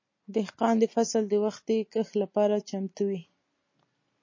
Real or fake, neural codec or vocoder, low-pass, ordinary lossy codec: real; none; 7.2 kHz; MP3, 32 kbps